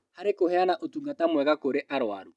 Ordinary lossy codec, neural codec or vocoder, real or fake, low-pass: none; none; real; none